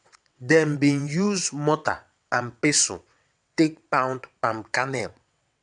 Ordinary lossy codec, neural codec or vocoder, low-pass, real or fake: none; vocoder, 22.05 kHz, 80 mel bands, WaveNeXt; 9.9 kHz; fake